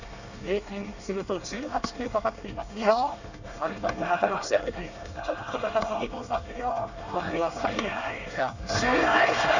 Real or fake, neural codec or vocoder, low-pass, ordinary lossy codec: fake; codec, 24 kHz, 1 kbps, SNAC; 7.2 kHz; none